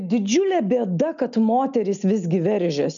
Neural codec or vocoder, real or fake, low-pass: none; real; 7.2 kHz